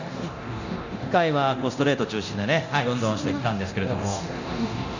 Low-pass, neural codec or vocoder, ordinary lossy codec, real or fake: 7.2 kHz; codec, 24 kHz, 0.9 kbps, DualCodec; none; fake